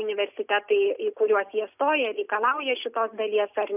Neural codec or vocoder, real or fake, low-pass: none; real; 3.6 kHz